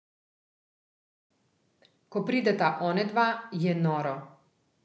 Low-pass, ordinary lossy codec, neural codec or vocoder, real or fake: none; none; none; real